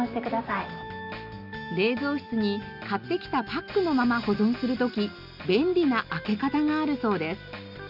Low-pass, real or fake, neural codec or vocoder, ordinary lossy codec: 5.4 kHz; real; none; none